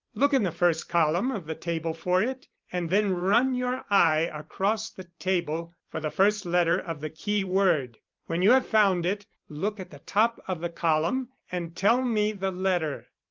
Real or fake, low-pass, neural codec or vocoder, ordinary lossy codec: fake; 7.2 kHz; vocoder, 44.1 kHz, 128 mel bands every 512 samples, BigVGAN v2; Opus, 24 kbps